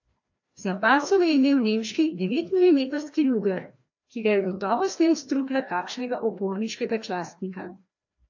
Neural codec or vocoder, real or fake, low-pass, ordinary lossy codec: codec, 16 kHz, 1 kbps, FreqCodec, larger model; fake; 7.2 kHz; AAC, 48 kbps